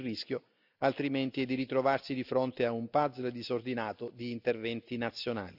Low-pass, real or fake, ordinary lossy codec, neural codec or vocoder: 5.4 kHz; real; none; none